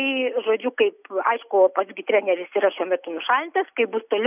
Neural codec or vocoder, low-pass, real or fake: none; 3.6 kHz; real